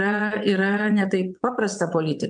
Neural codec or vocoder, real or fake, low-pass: vocoder, 22.05 kHz, 80 mel bands, WaveNeXt; fake; 9.9 kHz